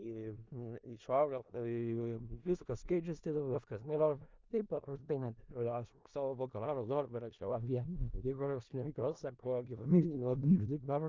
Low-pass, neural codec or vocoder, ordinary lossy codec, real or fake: 7.2 kHz; codec, 16 kHz in and 24 kHz out, 0.4 kbps, LongCat-Audio-Codec, four codebook decoder; Opus, 32 kbps; fake